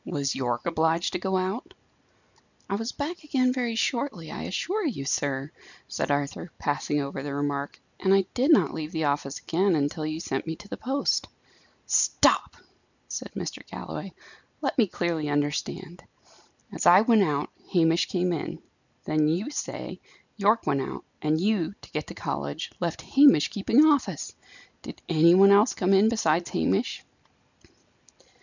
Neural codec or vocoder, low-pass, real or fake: none; 7.2 kHz; real